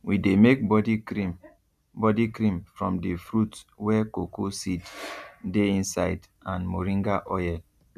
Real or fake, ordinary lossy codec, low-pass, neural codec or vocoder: real; none; 14.4 kHz; none